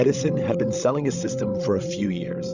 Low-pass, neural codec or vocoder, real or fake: 7.2 kHz; codec, 16 kHz, 16 kbps, FreqCodec, larger model; fake